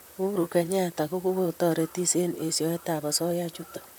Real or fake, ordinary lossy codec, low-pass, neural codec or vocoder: fake; none; none; vocoder, 44.1 kHz, 128 mel bands, Pupu-Vocoder